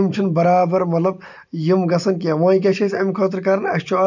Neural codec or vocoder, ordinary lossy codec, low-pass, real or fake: none; none; 7.2 kHz; real